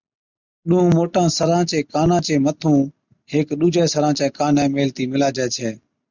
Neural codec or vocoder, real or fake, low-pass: none; real; 7.2 kHz